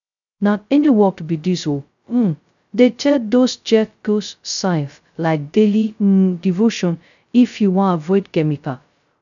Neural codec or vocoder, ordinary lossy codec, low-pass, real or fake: codec, 16 kHz, 0.2 kbps, FocalCodec; none; 7.2 kHz; fake